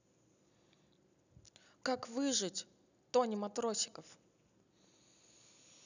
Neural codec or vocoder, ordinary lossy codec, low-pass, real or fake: none; none; 7.2 kHz; real